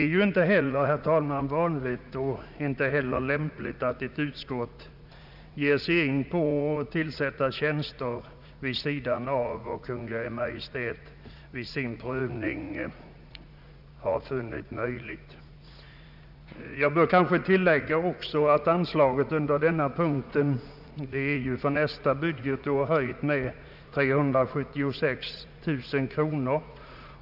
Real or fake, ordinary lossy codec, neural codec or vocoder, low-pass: fake; none; vocoder, 44.1 kHz, 80 mel bands, Vocos; 5.4 kHz